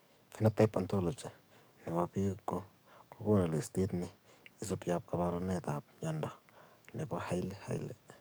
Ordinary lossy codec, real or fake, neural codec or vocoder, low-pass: none; fake; codec, 44.1 kHz, 7.8 kbps, DAC; none